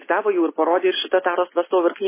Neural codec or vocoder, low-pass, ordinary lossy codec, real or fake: none; 3.6 kHz; MP3, 16 kbps; real